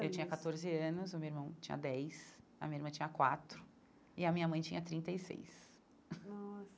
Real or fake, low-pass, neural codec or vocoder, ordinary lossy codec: real; none; none; none